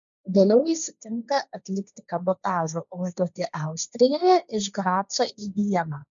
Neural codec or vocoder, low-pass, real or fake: codec, 16 kHz, 1.1 kbps, Voila-Tokenizer; 7.2 kHz; fake